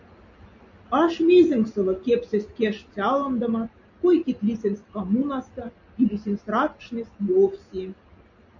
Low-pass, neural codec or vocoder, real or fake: 7.2 kHz; none; real